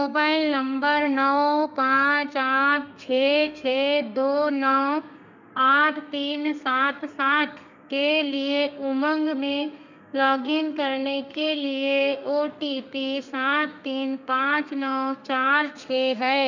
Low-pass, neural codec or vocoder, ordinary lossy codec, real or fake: 7.2 kHz; codec, 32 kHz, 1.9 kbps, SNAC; none; fake